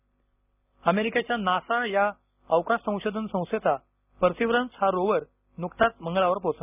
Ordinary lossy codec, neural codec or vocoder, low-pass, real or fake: none; none; 3.6 kHz; real